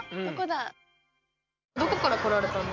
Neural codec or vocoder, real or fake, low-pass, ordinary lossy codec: none; real; 7.2 kHz; none